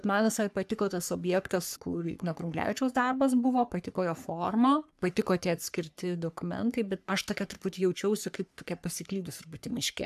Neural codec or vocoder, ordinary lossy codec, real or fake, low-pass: codec, 44.1 kHz, 3.4 kbps, Pupu-Codec; AAC, 96 kbps; fake; 14.4 kHz